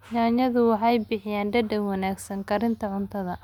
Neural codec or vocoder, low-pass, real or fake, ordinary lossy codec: none; 19.8 kHz; real; none